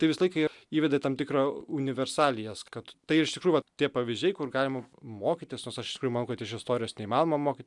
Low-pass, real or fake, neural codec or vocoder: 10.8 kHz; real; none